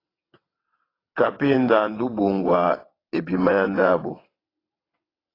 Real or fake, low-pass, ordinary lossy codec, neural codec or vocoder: fake; 5.4 kHz; AAC, 24 kbps; vocoder, 22.05 kHz, 80 mel bands, WaveNeXt